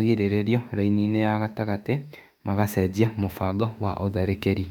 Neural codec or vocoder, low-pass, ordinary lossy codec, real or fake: autoencoder, 48 kHz, 32 numbers a frame, DAC-VAE, trained on Japanese speech; 19.8 kHz; none; fake